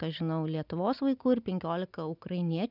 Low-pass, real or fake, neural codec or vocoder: 5.4 kHz; real; none